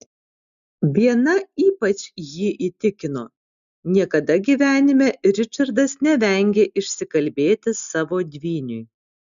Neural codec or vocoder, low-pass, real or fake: none; 7.2 kHz; real